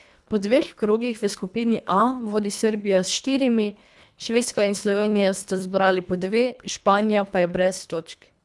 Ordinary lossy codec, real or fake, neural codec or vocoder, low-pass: none; fake; codec, 24 kHz, 1.5 kbps, HILCodec; none